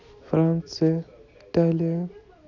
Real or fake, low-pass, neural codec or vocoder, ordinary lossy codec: real; 7.2 kHz; none; none